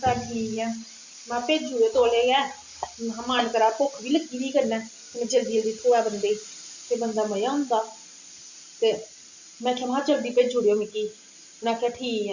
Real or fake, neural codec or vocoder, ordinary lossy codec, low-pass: real; none; Opus, 64 kbps; 7.2 kHz